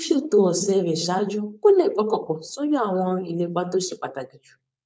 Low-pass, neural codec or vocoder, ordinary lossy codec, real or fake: none; codec, 16 kHz, 4.8 kbps, FACodec; none; fake